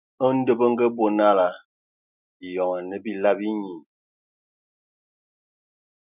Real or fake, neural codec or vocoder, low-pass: real; none; 3.6 kHz